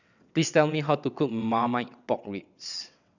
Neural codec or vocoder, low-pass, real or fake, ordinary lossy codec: vocoder, 22.05 kHz, 80 mel bands, WaveNeXt; 7.2 kHz; fake; none